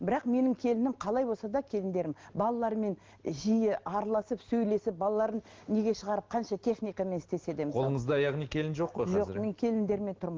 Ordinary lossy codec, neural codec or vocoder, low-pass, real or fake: Opus, 16 kbps; none; 7.2 kHz; real